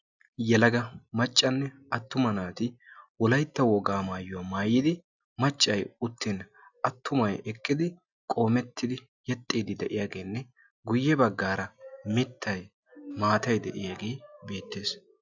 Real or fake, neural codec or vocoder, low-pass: real; none; 7.2 kHz